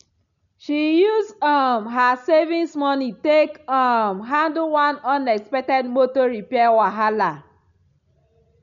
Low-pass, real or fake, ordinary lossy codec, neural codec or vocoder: 7.2 kHz; real; none; none